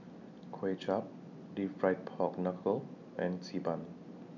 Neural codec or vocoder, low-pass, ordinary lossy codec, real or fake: none; 7.2 kHz; none; real